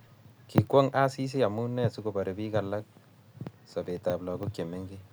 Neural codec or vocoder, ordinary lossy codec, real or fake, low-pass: none; none; real; none